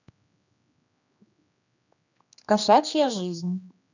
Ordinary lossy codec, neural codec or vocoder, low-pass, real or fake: none; codec, 16 kHz, 2 kbps, X-Codec, HuBERT features, trained on general audio; 7.2 kHz; fake